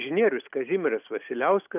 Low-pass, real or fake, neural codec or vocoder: 3.6 kHz; real; none